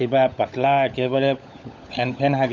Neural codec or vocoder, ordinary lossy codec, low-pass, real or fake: codec, 16 kHz, 16 kbps, FunCodec, trained on LibriTTS, 50 frames a second; none; none; fake